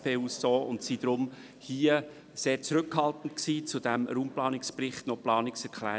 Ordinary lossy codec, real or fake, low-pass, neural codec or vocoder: none; real; none; none